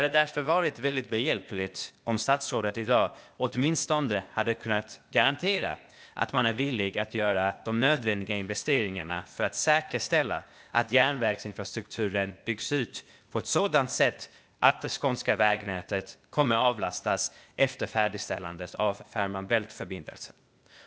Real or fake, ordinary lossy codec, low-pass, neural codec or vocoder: fake; none; none; codec, 16 kHz, 0.8 kbps, ZipCodec